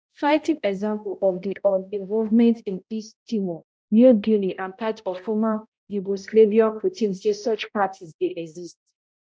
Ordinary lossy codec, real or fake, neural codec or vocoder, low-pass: none; fake; codec, 16 kHz, 0.5 kbps, X-Codec, HuBERT features, trained on balanced general audio; none